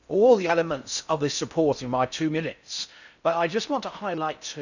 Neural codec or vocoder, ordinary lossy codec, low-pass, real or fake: codec, 16 kHz in and 24 kHz out, 0.6 kbps, FocalCodec, streaming, 4096 codes; none; 7.2 kHz; fake